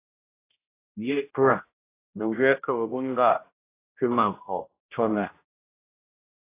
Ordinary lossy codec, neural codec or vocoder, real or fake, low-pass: AAC, 24 kbps; codec, 16 kHz, 0.5 kbps, X-Codec, HuBERT features, trained on general audio; fake; 3.6 kHz